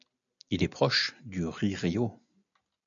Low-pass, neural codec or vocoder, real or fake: 7.2 kHz; none; real